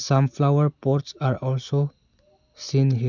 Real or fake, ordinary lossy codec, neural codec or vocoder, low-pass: real; none; none; 7.2 kHz